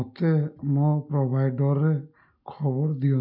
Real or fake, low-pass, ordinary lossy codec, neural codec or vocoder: real; 5.4 kHz; none; none